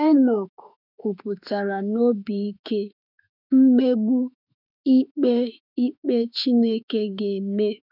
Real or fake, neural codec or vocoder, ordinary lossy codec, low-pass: fake; autoencoder, 48 kHz, 32 numbers a frame, DAC-VAE, trained on Japanese speech; none; 5.4 kHz